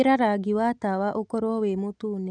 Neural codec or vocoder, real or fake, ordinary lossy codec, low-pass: none; real; none; 9.9 kHz